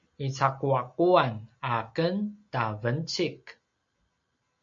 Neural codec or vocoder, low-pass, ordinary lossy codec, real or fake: none; 7.2 kHz; MP3, 48 kbps; real